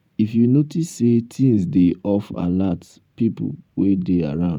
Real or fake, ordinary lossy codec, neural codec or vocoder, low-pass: fake; none; vocoder, 44.1 kHz, 128 mel bands every 512 samples, BigVGAN v2; 19.8 kHz